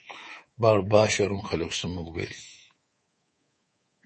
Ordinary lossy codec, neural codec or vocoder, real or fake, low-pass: MP3, 32 kbps; codec, 24 kHz, 3.1 kbps, DualCodec; fake; 10.8 kHz